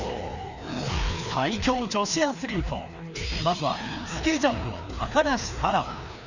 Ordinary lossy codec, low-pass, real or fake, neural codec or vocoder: none; 7.2 kHz; fake; codec, 16 kHz, 2 kbps, FreqCodec, larger model